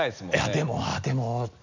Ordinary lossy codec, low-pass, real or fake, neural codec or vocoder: none; 7.2 kHz; real; none